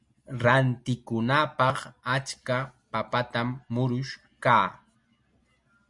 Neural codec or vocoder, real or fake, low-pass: none; real; 10.8 kHz